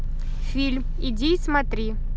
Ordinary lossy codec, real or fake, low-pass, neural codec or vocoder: none; real; none; none